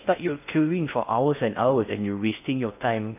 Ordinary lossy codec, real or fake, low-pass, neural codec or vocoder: AAC, 32 kbps; fake; 3.6 kHz; codec, 16 kHz in and 24 kHz out, 0.6 kbps, FocalCodec, streaming, 4096 codes